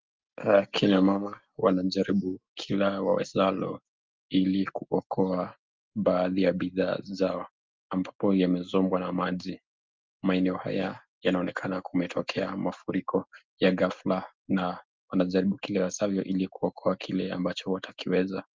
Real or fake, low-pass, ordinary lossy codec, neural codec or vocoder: real; 7.2 kHz; Opus, 24 kbps; none